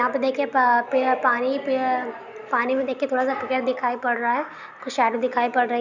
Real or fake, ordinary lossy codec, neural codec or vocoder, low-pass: real; none; none; 7.2 kHz